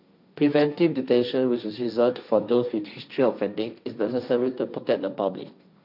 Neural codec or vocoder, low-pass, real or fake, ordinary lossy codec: codec, 16 kHz, 1.1 kbps, Voila-Tokenizer; 5.4 kHz; fake; none